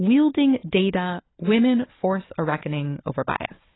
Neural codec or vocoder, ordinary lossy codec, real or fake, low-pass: codec, 16 kHz, 4 kbps, FunCodec, trained on Chinese and English, 50 frames a second; AAC, 16 kbps; fake; 7.2 kHz